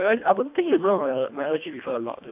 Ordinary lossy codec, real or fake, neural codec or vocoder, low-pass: AAC, 32 kbps; fake; codec, 24 kHz, 1.5 kbps, HILCodec; 3.6 kHz